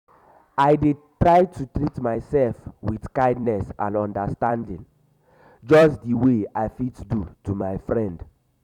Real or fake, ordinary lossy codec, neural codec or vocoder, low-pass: fake; none; vocoder, 44.1 kHz, 128 mel bands every 256 samples, BigVGAN v2; 19.8 kHz